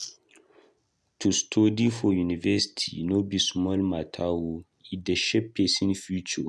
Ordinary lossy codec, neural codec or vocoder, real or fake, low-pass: none; none; real; none